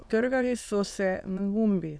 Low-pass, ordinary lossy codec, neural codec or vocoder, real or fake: none; none; autoencoder, 22.05 kHz, a latent of 192 numbers a frame, VITS, trained on many speakers; fake